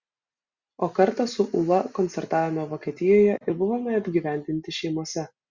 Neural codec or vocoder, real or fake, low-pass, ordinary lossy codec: none; real; 7.2 kHz; Opus, 64 kbps